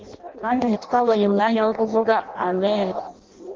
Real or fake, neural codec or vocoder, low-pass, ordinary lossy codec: fake; codec, 16 kHz in and 24 kHz out, 0.6 kbps, FireRedTTS-2 codec; 7.2 kHz; Opus, 16 kbps